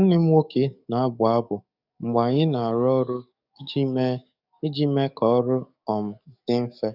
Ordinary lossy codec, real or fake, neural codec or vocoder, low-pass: none; fake; codec, 44.1 kHz, 7.8 kbps, DAC; 5.4 kHz